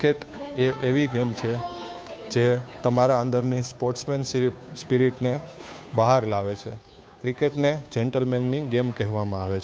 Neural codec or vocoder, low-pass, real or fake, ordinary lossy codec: codec, 16 kHz, 2 kbps, FunCodec, trained on Chinese and English, 25 frames a second; none; fake; none